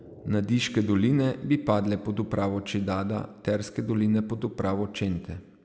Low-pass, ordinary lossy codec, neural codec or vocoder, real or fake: none; none; none; real